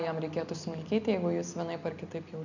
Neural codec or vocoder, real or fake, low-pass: none; real; 7.2 kHz